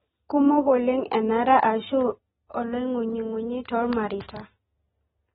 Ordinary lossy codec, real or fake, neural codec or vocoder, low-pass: AAC, 16 kbps; real; none; 19.8 kHz